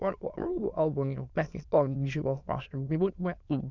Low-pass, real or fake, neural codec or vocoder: 7.2 kHz; fake; autoencoder, 22.05 kHz, a latent of 192 numbers a frame, VITS, trained on many speakers